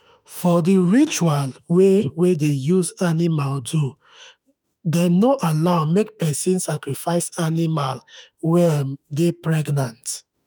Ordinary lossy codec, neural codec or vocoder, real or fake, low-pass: none; autoencoder, 48 kHz, 32 numbers a frame, DAC-VAE, trained on Japanese speech; fake; none